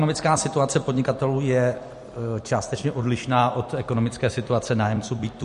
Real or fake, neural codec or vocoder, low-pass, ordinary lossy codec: fake; vocoder, 44.1 kHz, 128 mel bands every 256 samples, BigVGAN v2; 14.4 kHz; MP3, 48 kbps